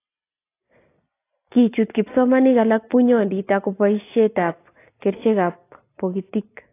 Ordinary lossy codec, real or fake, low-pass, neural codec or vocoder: AAC, 24 kbps; real; 3.6 kHz; none